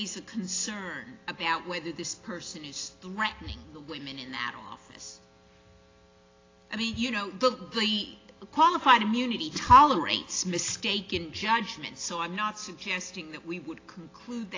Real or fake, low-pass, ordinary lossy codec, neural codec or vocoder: real; 7.2 kHz; AAC, 32 kbps; none